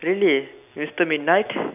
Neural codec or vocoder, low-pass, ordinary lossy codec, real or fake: vocoder, 44.1 kHz, 128 mel bands every 256 samples, BigVGAN v2; 3.6 kHz; none; fake